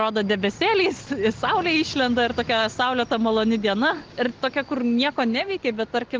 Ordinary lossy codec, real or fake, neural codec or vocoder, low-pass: Opus, 16 kbps; real; none; 7.2 kHz